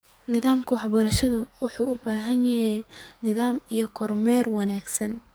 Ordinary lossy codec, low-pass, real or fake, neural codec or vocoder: none; none; fake; codec, 44.1 kHz, 2.6 kbps, SNAC